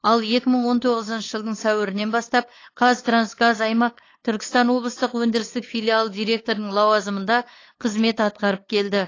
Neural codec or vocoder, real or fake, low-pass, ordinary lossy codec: codec, 16 kHz, 8 kbps, FunCodec, trained on LibriTTS, 25 frames a second; fake; 7.2 kHz; AAC, 32 kbps